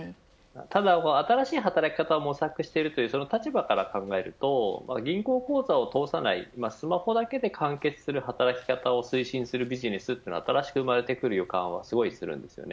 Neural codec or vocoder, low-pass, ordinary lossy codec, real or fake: none; none; none; real